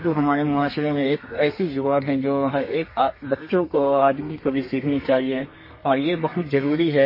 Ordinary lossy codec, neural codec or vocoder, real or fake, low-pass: MP3, 24 kbps; codec, 32 kHz, 1.9 kbps, SNAC; fake; 5.4 kHz